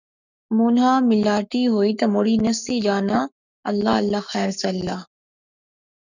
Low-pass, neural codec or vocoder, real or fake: 7.2 kHz; codec, 44.1 kHz, 7.8 kbps, Pupu-Codec; fake